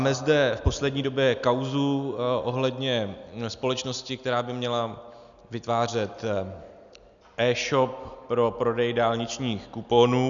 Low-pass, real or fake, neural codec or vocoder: 7.2 kHz; real; none